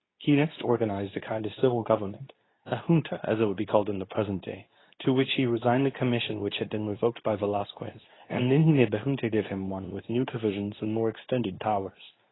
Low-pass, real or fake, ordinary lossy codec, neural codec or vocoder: 7.2 kHz; fake; AAC, 16 kbps; codec, 24 kHz, 0.9 kbps, WavTokenizer, medium speech release version 2